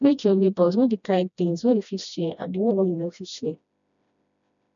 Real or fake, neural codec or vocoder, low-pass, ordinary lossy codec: fake; codec, 16 kHz, 1 kbps, FreqCodec, smaller model; 7.2 kHz; none